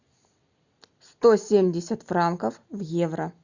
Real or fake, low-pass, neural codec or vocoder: real; 7.2 kHz; none